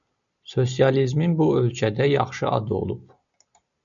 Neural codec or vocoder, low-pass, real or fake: none; 7.2 kHz; real